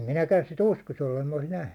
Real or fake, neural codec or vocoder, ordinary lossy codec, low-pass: real; none; none; 19.8 kHz